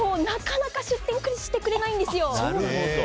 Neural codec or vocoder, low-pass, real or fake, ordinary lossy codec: none; none; real; none